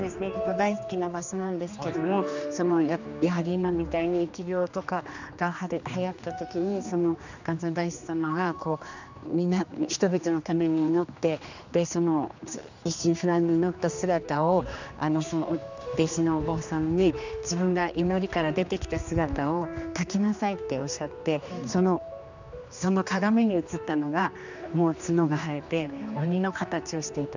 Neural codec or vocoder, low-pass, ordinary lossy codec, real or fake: codec, 16 kHz, 2 kbps, X-Codec, HuBERT features, trained on general audio; 7.2 kHz; none; fake